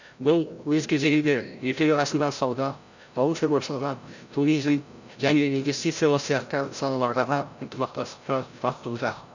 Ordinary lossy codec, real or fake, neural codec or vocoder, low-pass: none; fake; codec, 16 kHz, 0.5 kbps, FreqCodec, larger model; 7.2 kHz